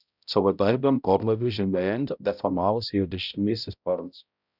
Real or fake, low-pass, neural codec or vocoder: fake; 5.4 kHz; codec, 16 kHz, 0.5 kbps, X-Codec, HuBERT features, trained on balanced general audio